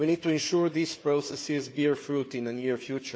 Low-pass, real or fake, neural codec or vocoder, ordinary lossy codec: none; fake; codec, 16 kHz, 4 kbps, FunCodec, trained on Chinese and English, 50 frames a second; none